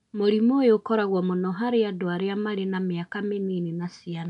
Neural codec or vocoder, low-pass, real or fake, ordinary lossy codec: none; 10.8 kHz; real; none